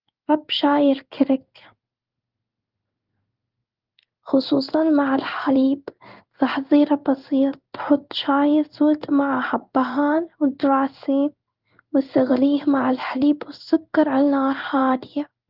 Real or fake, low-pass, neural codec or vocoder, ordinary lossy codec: fake; 5.4 kHz; codec, 16 kHz in and 24 kHz out, 1 kbps, XY-Tokenizer; Opus, 32 kbps